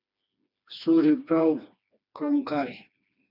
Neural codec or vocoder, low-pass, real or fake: codec, 16 kHz, 2 kbps, FreqCodec, smaller model; 5.4 kHz; fake